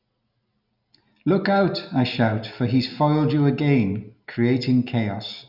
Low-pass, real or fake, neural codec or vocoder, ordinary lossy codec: 5.4 kHz; real; none; none